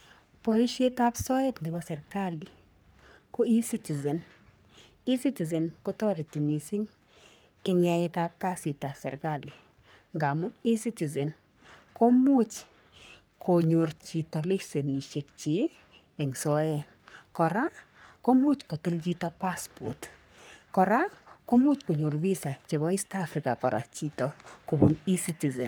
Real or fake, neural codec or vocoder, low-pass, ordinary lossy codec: fake; codec, 44.1 kHz, 3.4 kbps, Pupu-Codec; none; none